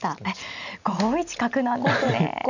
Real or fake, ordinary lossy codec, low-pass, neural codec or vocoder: real; none; 7.2 kHz; none